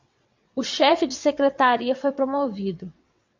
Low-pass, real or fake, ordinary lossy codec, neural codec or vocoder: 7.2 kHz; fake; MP3, 64 kbps; vocoder, 22.05 kHz, 80 mel bands, WaveNeXt